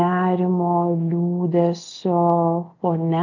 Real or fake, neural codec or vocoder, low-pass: real; none; 7.2 kHz